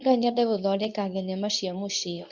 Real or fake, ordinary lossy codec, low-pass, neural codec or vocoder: fake; none; 7.2 kHz; codec, 24 kHz, 0.9 kbps, WavTokenizer, medium speech release version 2